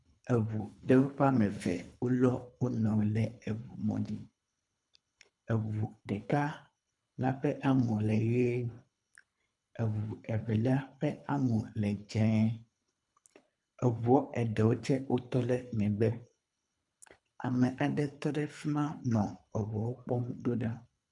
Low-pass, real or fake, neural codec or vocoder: 10.8 kHz; fake; codec, 24 kHz, 3 kbps, HILCodec